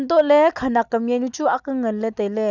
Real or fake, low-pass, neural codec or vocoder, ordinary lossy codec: real; 7.2 kHz; none; none